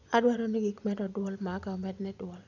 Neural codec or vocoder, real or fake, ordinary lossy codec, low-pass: vocoder, 24 kHz, 100 mel bands, Vocos; fake; none; 7.2 kHz